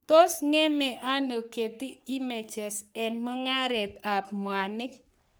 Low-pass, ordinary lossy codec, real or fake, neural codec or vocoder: none; none; fake; codec, 44.1 kHz, 3.4 kbps, Pupu-Codec